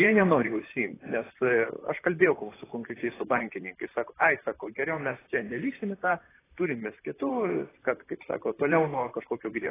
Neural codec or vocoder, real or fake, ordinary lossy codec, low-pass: vocoder, 44.1 kHz, 128 mel bands, Pupu-Vocoder; fake; AAC, 16 kbps; 3.6 kHz